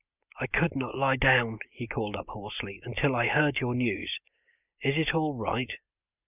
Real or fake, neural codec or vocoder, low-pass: real; none; 3.6 kHz